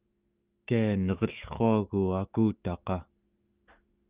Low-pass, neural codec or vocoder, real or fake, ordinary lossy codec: 3.6 kHz; autoencoder, 48 kHz, 128 numbers a frame, DAC-VAE, trained on Japanese speech; fake; Opus, 24 kbps